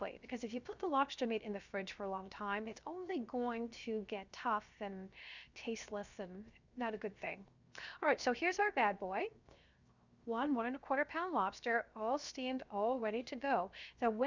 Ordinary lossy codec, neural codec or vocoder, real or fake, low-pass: Opus, 64 kbps; codec, 16 kHz, 0.7 kbps, FocalCodec; fake; 7.2 kHz